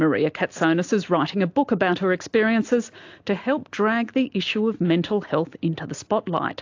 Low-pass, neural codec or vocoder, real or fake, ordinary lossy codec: 7.2 kHz; none; real; AAC, 48 kbps